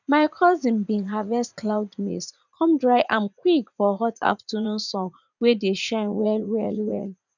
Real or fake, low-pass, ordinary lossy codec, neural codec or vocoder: fake; 7.2 kHz; none; vocoder, 44.1 kHz, 80 mel bands, Vocos